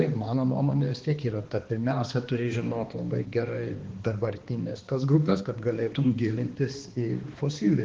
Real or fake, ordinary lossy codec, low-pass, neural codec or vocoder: fake; Opus, 16 kbps; 7.2 kHz; codec, 16 kHz, 2 kbps, X-Codec, HuBERT features, trained on balanced general audio